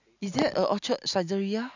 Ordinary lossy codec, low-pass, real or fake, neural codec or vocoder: none; 7.2 kHz; real; none